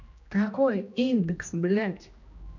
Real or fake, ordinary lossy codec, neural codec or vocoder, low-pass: fake; none; codec, 16 kHz, 1 kbps, X-Codec, HuBERT features, trained on general audio; 7.2 kHz